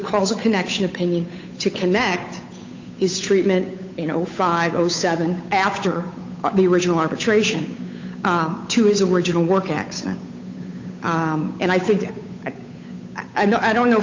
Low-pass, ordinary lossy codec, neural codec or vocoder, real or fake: 7.2 kHz; MP3, 64 kbps; codec, 16 kHz, 8 kbps, FunCodec, trained on Chinese and English, 25 frames a second; fake